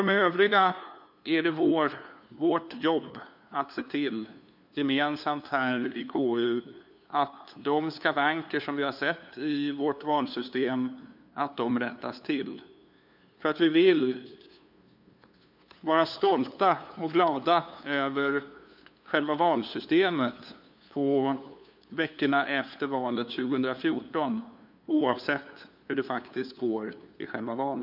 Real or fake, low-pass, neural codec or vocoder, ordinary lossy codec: fake; 5.4 kHz; codec, 16 kHz, 2 kbps, FunCodec, trained on LibriTTS, 25 frames a second; none